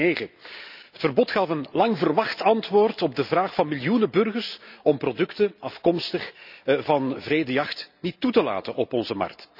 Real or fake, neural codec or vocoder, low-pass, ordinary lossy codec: real; none; 5.4 kHz; none